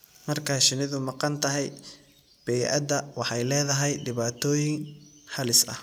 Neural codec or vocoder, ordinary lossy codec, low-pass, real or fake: none; none; none; real